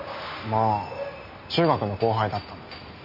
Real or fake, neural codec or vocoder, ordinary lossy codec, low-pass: real; none; none; 5.4 kHz